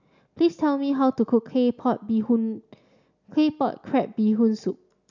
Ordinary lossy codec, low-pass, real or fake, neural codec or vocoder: none; 7.2 kHz; real; none